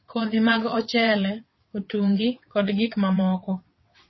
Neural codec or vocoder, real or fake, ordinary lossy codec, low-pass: vocoder, 22.05 kHz, 80 mel bands, WaveNeXt; fake; MP3, 24 kbps; 7.2 kHz